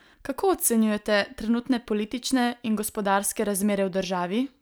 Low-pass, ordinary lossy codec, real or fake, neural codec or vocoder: none; none; real; none